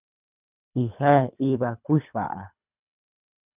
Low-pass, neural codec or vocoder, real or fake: 3.6 kHz; codec, 24 kHz, 3 kbps, HILCodec; fake